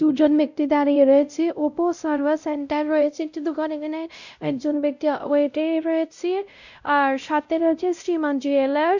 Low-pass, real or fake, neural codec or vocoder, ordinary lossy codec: 7.2 kHz; fake; codec, 16 kHz, 0.5 kbps, X-Codec, WavLM features, trained on Multilingual LibriSpeech; none